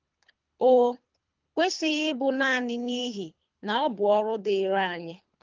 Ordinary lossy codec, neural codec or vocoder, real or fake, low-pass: Opus, 32 kbps; codec, 24 kHz, 3 kbps, HILCodec; fake; 7.2 kHz